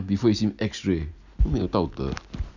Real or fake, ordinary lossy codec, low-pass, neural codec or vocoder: real; none; 7.2 kHz; none